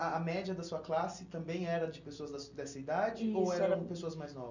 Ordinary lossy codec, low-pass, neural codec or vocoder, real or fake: none; 7.2 kHz; none; real